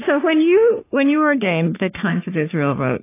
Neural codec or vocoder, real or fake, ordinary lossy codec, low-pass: codec, 44.1 kHz, 3.4 kbps, Pupu-Codec; fake; AAC, 24 kbps; 3.6 kHz